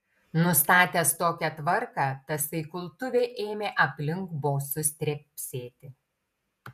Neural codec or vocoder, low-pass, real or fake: vocoder, 48 kHz, 128 mel bands, Vocos; 14.4 kHz; fake